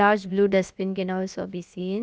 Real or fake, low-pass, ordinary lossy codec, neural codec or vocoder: fake; none; none; codec, 16 kHz, about 1 kbps, DyCAST, with the encoder's durations